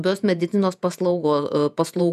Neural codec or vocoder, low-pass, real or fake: vocoder, 48 kHz, 128 mel bands, Vocos; 14.4 kHz; fake